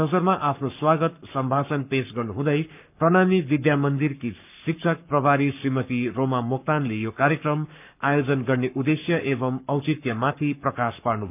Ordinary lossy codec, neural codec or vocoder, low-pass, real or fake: none; codec, 44.1 kHz, 7.8 kbps, Pupu-Codec; 3.6 kHz; fake